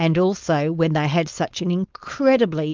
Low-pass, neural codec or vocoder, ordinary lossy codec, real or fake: 7.2 kHz; codec, 16 kHz, 4.8 kbps, FACodec; Opus, 24 kbps; fake